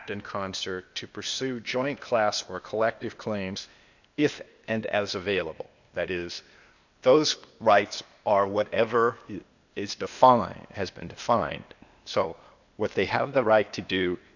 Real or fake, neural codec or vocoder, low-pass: fake; codec, 16 kHz, 0.8 kbps, ZipCodec; 7.2 kHz